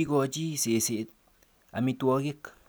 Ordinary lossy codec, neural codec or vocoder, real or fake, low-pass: none; none; real; none